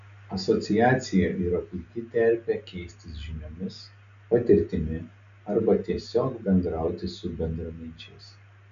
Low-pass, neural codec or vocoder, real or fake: 7.2 kHz; none; real